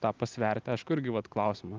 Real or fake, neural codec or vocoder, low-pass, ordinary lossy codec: real; none; 7.2 kHz; Opus, 24 kbps